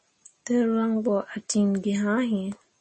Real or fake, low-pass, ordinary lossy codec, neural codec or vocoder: real; 10.8 kHz; MP3, 32 kbps; none